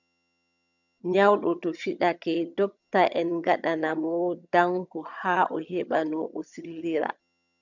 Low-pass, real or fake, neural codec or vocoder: 7.2 kHz; fake; vocoder, 22.05 kHz, 80 mel bands, HiFi-GAN